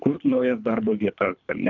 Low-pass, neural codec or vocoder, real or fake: 7.2 kHz; codec, 24 kHz, 3 kbps, HILCodec; fake